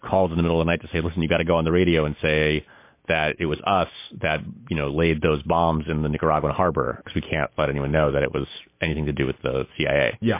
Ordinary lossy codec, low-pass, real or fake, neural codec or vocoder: MP3, 24 kbps; 3.6 kHz; real; none